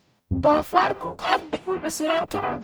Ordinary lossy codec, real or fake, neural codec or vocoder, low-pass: none; fake; codec, 44.1 kHz, 0.9 kbps, DAC; none